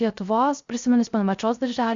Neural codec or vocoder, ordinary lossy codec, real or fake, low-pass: codec, 16 kHz, 0.3 kbps, FocalCodec; Opus, 64 kbps; fake; 7.2 kHz